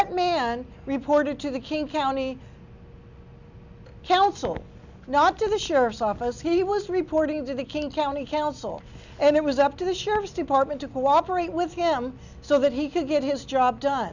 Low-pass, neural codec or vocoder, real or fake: 7.2 kHz; none; real